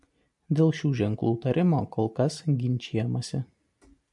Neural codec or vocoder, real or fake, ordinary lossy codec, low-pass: none; real; MP3, 64 kbps; 10.8 kHz